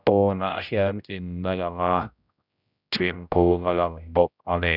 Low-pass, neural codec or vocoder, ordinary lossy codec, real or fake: 5.4 kHz; codec, 16 kHz, 0.5 kbps, X-Codec, HuBERT features, trained on general audio; none; fake